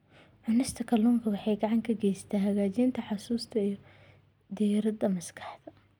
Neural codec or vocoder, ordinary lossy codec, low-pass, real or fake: none; none; 19.8 kHz; real